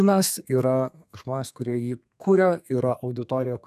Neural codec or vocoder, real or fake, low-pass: codec, 32 kHz, 1.9 kbps, SNAC; fake; 14.4 kHz